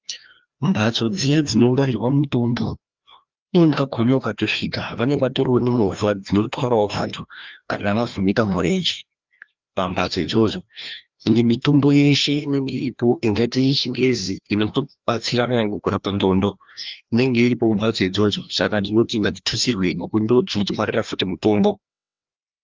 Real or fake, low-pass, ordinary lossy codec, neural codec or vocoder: fake; 7.2 kHz; Opus, 32 kbps; codec, 16 kHz, 1 kbps, FreqCodec, larger model